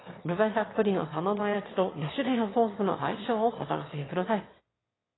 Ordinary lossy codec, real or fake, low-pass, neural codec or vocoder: AAC, 16 kbps; fake; 7.2 kHz; autoencoder, 22.05 kHz, a latent of 192 numbers a frame, VITS, trained on one speaker